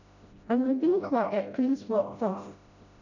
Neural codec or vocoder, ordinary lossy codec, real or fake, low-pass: codec, 16 kHz, 0.5 kbps, FreqCodec, smaller model; none; fake; 7.2 kHz